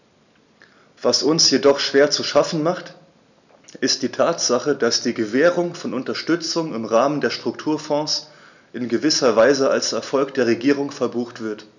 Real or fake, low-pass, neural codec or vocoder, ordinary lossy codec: real; 7.2 kHz; none; none